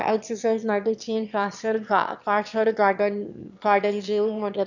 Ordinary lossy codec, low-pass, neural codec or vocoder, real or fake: none; 7.2 kHz; autoencoder, 22.05 kHz, a latent of 192 numbers a frame, VITS, trained on one speaker; fake